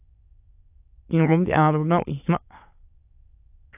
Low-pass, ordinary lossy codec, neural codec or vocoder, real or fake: 3.6 kHz; AAC, 32 kbps; autoencoder, 22.05 kHz, a latent of 192 numbers a frame, VITS, trained on many speakers; fake